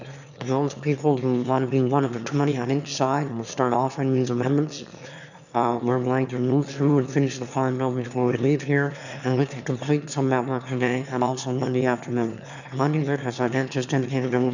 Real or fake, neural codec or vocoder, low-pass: fake; autoencoder, 22.05 kHz, a latent of 192 numbers a frame, VITS, trained on one speaker; 7.2 kHz